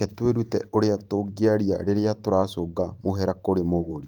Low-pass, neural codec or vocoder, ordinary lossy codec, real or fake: 19.8 kHz; vocoder, 44.1 kHz, 128 mel bands every 512 samples, BigVGAN v2; Opus, 32 kbps; fake